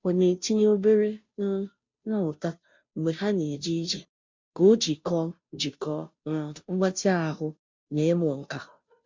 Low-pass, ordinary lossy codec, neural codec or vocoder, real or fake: 7.2 kHz; MP3, 64 kbps; codec, 16 kHz, 0.5 kbps, FunCodec, trained on Chinese and English, 25 frames a second; fake